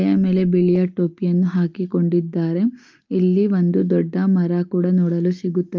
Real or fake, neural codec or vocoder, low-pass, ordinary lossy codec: real; none; 7.2 kHz; Opus, 24 kbps